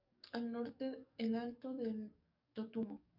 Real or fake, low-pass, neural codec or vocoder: fake; 5.4 kHz; codec, 44.1 kHz, 7.8 kbps, DAC